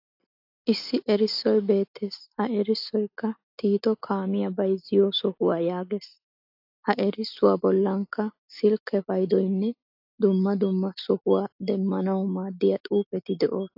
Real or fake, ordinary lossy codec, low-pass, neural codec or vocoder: real; AAC, 48 kbps; 5.4 kHz; none